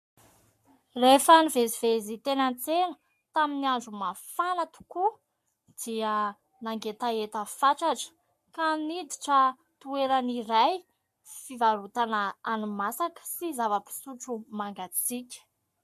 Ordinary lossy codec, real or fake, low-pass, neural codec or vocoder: MP3, 64 kbps; fake; 14.4 kHz; codec, 44.1 kHz, 7.8 kbps, Pupu-Codec